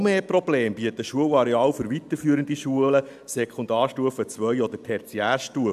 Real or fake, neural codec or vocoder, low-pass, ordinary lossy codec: real; none; 14.4 kHz; AAC, 96 kbps